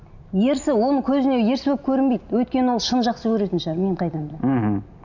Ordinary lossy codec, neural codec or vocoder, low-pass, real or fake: none; none; 7.2 kHz; real